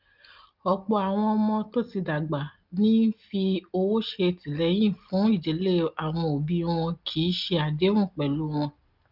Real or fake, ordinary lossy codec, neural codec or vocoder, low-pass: real; Opus, 32 kbps; none; 5.4 kHz